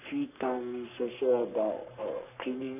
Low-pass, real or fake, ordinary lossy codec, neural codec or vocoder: 3.6 kHz; fake; none; codec, 44.1 kHz, 3.4 kbps, Pupu-Codec